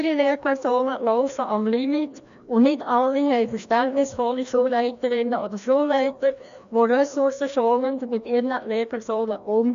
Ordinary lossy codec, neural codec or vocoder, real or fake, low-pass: AAC, 96 kbps; codec, 16 kHz, 1 kbps, FreqCodec, larger model; fake; 7.2 kHz